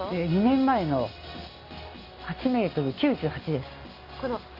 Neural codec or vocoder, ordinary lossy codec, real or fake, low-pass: none; Opus, 32 kbps; real; 5.4 kHz